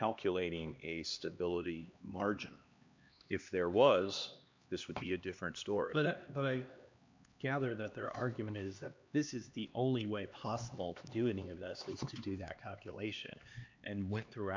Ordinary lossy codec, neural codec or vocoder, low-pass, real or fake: MP3, 64 kbps; codec, 16 kHz, 2 kbps, X-Codec, HuBERT features, trained on LibriSpeech; 7.2 kHz; fake